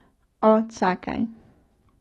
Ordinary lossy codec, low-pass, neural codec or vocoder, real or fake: AAC, 32 kbps; 19.8 kHz; autoencoder, 48 kHz, 128 numbers a frame, DAC-VAE, trained on Japanese speech; fake